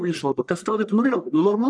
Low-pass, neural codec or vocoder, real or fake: 9.9 kHz; codec, 44.1 kHz, 1.7 kbps, Pupu-Codec; fake